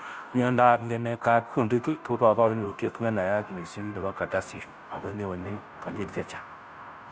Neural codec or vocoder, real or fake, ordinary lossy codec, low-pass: codec, 16 kHz, 0.5 kbps, FunCodec, trained on Chinese and English, 25 frames a second; fake; none; none